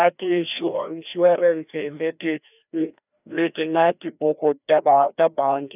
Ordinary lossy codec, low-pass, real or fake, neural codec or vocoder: none; 3.6 kHz; fake; codec, 16 kHz, 1 kbps, FreqCodec, larger model